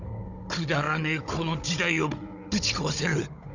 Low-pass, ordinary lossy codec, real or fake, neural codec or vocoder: 7.2 kHz; none; fake; codec, 16 kHz, 16 kbps, FunCodec, trained on Chinese and English, 50 frames a second